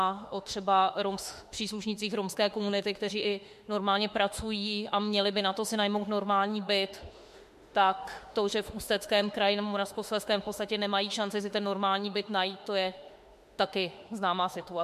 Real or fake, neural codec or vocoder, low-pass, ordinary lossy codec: fake; autoencoder, 48 kHz, 32 numbers a frame, DAC-VAE, trained on Japanese speech; 14.4 kHz; MP3, 64 kbps